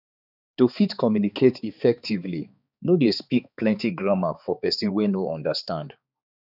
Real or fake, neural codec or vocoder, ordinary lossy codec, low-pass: fake; codec, 16 kHz, 4 kbps, X-Codec, HuBERT features, trained on balanced general audio; none; 5.4 kHz